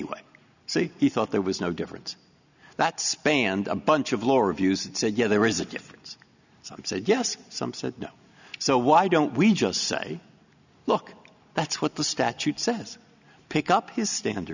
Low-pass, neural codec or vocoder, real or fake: 7.2 kHz; none; real